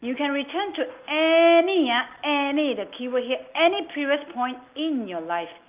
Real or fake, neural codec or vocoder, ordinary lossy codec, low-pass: real; none; Opus, 24 kbps; 3.6 kHz